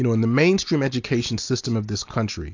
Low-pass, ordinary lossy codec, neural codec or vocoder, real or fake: 7.2 kHz; AAC, 48 kbps; none; real